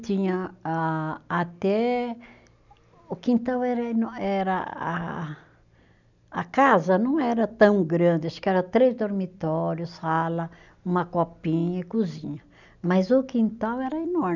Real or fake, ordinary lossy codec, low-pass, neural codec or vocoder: real; none; 7.2 kHz; none